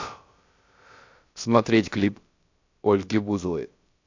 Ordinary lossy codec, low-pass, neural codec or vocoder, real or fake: AAC, 48 kbps; 7.2 kHz; codec, 16 kHz, about 1 kbps, DyCAST, with the encoder's durations; fake